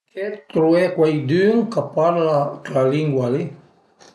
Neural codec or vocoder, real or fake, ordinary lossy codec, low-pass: none; real; none; none